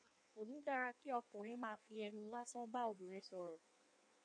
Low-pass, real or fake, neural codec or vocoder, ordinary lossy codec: 9.9 kHz; fake; codec, 16 kHz in and 24 kHz out, 1.1 kbps, FireRedTTS-2 codec; MP3, 64 kbps